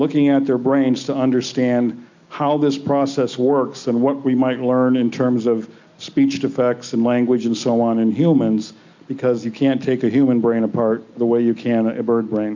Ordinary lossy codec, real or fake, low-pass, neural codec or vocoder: MP3, 64 kbps; real; 7.2 kHz; none